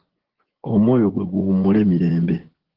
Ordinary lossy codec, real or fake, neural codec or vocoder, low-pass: Opus, 16 kbps; fake; vocoder, 24 kHz, 100 mel bands, Vocos; 5.4 kHz